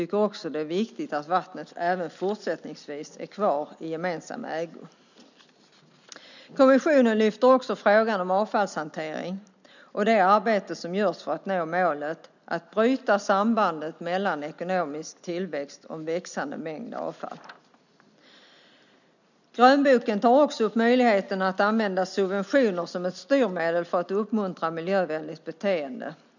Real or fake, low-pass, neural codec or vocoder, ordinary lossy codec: real; 7.2 kHz; none; none